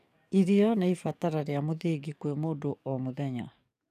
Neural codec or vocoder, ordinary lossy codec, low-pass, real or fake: codec, 44.1 kHz, 7.8 kbps, DAC; none; 14.4 kHz; fake